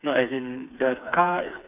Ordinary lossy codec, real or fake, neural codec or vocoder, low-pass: none; fake; codec, 16 kHz, 4 kbps, FreqCodec, smaller model; 3.6 kHz